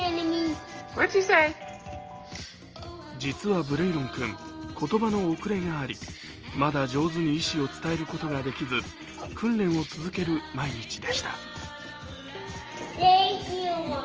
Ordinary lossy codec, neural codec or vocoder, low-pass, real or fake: Opus, 24 kbps; none; 7.2 kHz; real